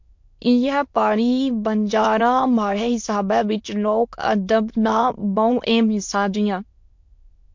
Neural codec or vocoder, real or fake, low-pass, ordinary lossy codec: autoencoder, 22.05 kHz, a latent of 192 numbers a frame, VITS, trained on many speakers; fake; 7.2 kHz; MP3, 48 kbps